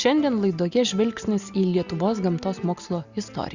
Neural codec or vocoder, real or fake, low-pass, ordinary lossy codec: none; real; 7.2 kHz; Opus, 64 kbps